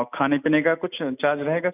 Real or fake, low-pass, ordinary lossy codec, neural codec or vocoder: real; 3.6 kHz; none; none